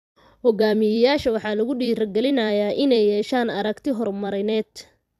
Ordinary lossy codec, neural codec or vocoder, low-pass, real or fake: AAC, 96 kbps; vocoder, 44.1 kHz, 128 mel bands every 256 samples, BigVGAN v2; 14.4 kHz; fake